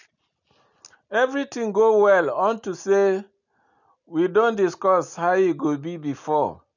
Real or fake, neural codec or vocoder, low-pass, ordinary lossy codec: real; none; 7.2 kHz; none